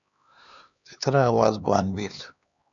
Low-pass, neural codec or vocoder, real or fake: 7.2 kHz; codec, 16 kHz, 2 kbps, X-Codec, HuBERT features, trained on LibriSpeech; fake